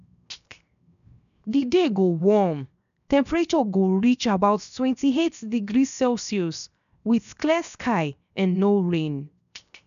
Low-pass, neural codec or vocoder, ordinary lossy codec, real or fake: 7.2 kHz; codec, 16 kHz, 0.7 kbps, FocalCodec; none; fake